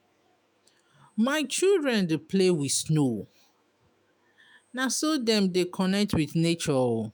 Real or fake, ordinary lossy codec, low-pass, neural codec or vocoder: fake; none; none; autoencoder, 48 kHz, 128 numbers a frame, DAC-VAE, trained on Japanese speech